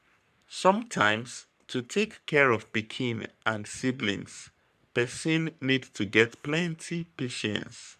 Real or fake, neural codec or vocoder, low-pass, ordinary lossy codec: fake; codec, 44.1 kHz, 3.4 kbps, Pupu-Codec; 14.4 kHz; none